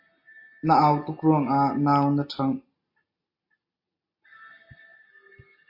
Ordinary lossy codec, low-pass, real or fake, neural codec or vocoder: MP3, 32 kbps; 5.4 kHz; real; none